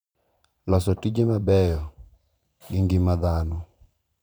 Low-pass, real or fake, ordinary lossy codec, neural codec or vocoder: none; fake; none; vocoder, 44.1 kHz, 128 mel bands every 512 samples, BigVGAN v2